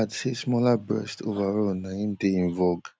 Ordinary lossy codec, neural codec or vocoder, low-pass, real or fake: none; none; none; real